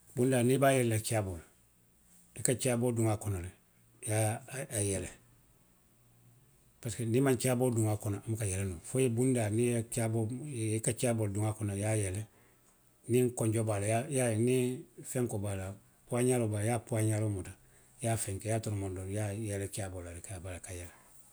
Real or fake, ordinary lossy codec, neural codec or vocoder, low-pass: real; none; none; none